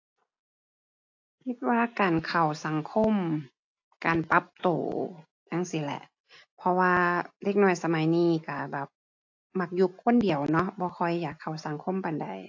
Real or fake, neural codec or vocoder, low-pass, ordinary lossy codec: real; none; 7.2 kHz; AAC, 48 kbps